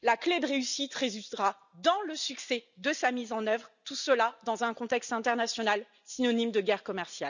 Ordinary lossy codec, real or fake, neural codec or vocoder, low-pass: none; real; none; 7.2 kHz